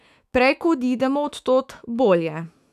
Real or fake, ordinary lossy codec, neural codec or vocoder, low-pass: fake; none; autoencoder, 48 kHz, 128 numbers a frame, DAC-VAE, trained on Japanese speech; 14.4 kHz